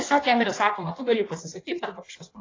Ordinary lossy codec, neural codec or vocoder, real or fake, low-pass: AAC, 32 kbps; codec, 16 kHz in and 24 kHz out, 1.1 kbps, FireRedTTS-2 codec; fake; 7.2 kHz